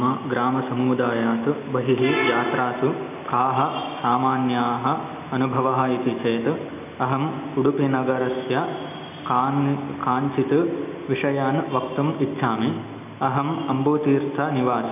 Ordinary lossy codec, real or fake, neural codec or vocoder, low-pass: none; real; none; 3.6 kHz